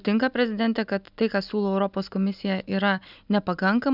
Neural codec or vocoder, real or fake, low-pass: none; real; 5.4 kHz